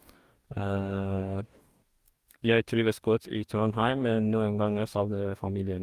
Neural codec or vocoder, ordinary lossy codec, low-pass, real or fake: codec, 44.1 kHz, 2.6 kbps, DAC; Opus, 32 kbps; 14.4 kHz; fake